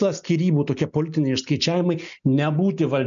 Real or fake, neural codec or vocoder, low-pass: real; none; 7.2 kHz